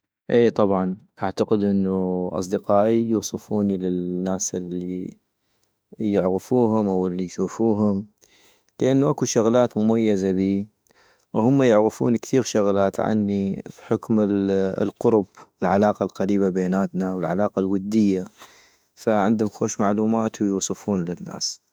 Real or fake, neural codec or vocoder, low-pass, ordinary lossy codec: fake; autoencoder, 48 kHz, 32 numbers a frame, DAC-VAE, trained on Japanese speech; none; none